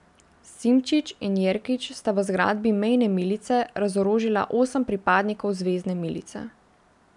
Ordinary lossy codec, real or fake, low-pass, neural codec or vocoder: none; real; 10.8 kHz; none